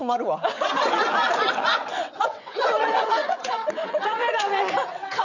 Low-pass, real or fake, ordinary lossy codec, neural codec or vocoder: 7.2 kHz; fake; none; vocoder, 22.05 kHz, 80 mel bands, WaveNeXt